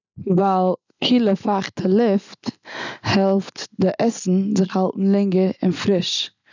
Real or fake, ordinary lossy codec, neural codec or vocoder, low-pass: real; none; none; 7.2 kHz